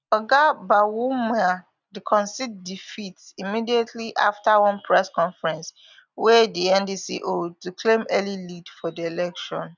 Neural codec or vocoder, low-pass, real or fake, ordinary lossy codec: none; 7.2 kHz; real; none